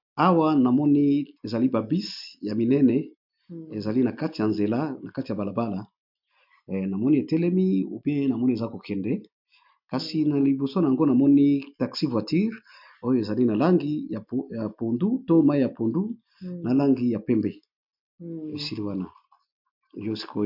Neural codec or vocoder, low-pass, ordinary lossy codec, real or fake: none; 5.4 kHz; MP3, 48 kbps; real